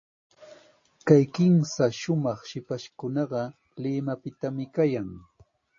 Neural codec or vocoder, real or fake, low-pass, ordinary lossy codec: none; real; 7.2 kHz; MP3, 32 kbps